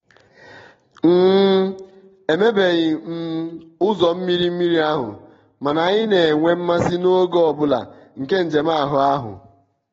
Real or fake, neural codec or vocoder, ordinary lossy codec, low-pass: real; none; AAC, 24 kbps; 7.2 kHz